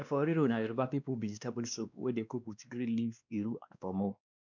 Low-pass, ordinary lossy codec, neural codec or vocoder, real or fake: 7.2 kHz; none; codec, 16 kHz, 2 kbps, X-Codec, WavLM features, trained on Multilingual LibriSpeech; fake